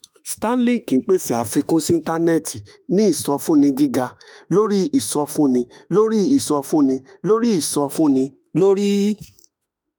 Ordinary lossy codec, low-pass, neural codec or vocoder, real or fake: none; none; autoencoder, 48 kHz, 32 numbers a frame, DAC-VAE, trained on Japanese speech; fake